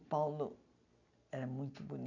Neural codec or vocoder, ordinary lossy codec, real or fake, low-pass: none; none; real; 7.2 kHz